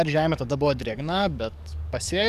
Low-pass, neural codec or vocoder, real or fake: 14.4 kHz; codec, 44.1 kHz, 7.8 kbps, DAC; fake